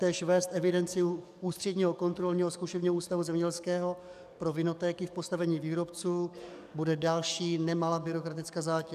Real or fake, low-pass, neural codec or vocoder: fake; 14.4 kHz; codec, 44.1 kHz, 7.8 kbps, DAC